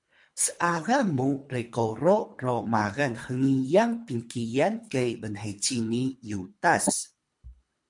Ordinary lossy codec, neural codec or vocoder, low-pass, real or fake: MP3, 64 kbps; codec, 24 kHz, 3 kbps, HILCodec; 10.8 kHz; fake